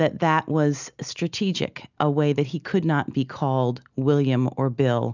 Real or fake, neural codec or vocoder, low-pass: real; none; 7.2 kHz